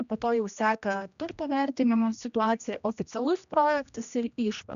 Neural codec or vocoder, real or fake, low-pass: codec, 16 kHz, 1 kbps, X-Codec, HuBERT features, trained on general audio; fake; 7.2 kHz